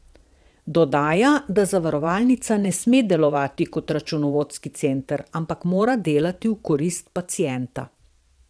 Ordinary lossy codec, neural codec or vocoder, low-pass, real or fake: none; vocoder, 22.05 kHz, 80 mel bands, Vocos; none; fake